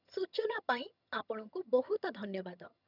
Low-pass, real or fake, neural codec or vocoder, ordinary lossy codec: 5.4 kHz; fake; vocoder, 22.05 kHz, 80 mel bands, HiFi-GAN; none